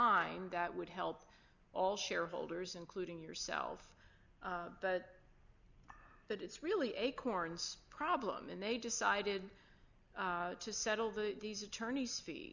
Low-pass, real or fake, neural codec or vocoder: 7.2 kHz; real; none